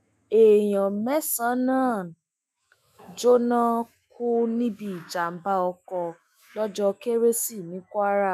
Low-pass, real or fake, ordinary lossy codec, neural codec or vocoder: 14.4 kHz; fake; none; autoencoder, 48 kHz, 128 numbers a frame, DAC-VAE, trained on Japanese speech